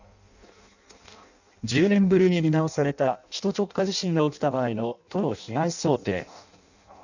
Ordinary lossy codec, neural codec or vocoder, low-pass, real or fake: none; codec, 16 kHz in and 24 kHz out, 0.6 kbps, FireRedTTS-2 codec; 7.2 kHz; fake